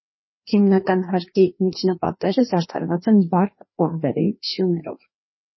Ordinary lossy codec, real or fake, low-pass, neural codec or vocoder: MP3, 24 kbps; fake; 7.2 kHz; codec, 16 kHz, 2 kbps, FreqCodec, larger model